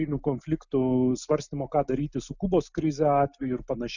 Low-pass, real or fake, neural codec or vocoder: 7.2 kHz; real; none